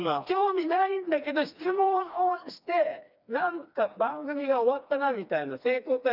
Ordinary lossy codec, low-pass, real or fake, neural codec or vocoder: none; 5.4 kHz; fake; codec, 16 kHz, 2 kbps, FreqCodec, smaller model